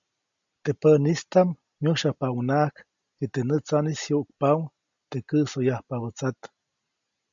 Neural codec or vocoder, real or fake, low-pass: none; real; 7.2 kHz